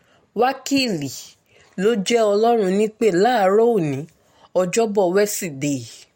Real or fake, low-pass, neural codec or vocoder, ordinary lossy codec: real; 19.8 kHz; none; MP3, 64 kbps